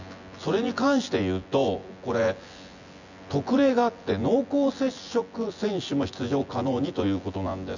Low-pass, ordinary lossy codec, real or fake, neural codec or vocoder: 7.2 kHz; none; fake; vocoder, 24 kHz, 100 mel bands, Vocos